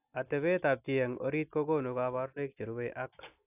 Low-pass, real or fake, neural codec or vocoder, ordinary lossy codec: 3.6 kHz; real; none; AAC, 32 kbps